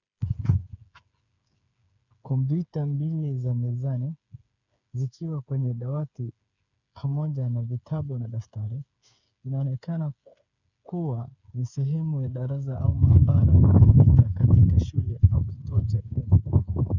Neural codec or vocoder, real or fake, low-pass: codec, 16 kHz, 8 kbps, FreqCodec, smaller model; fake; 7.2 kHz